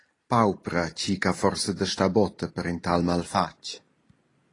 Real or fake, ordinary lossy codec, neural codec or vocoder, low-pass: real; AAC, 32 kbps; none; 10.8 kHz